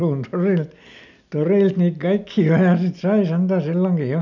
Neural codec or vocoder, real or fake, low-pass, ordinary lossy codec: none; real; 7.2 kHz; none